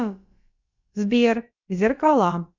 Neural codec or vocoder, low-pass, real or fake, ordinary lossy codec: codec, 16 kHz, about 1 kbps, DyCAST, with the encoder's durations; 7.2 kHz; fake; Opus, 64 kbps